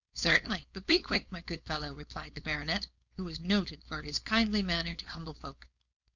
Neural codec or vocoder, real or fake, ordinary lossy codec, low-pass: codec, 16 kHz, 4.8 kbps, FACodec; fake; Opus, 64 kbps; 7.2 kHz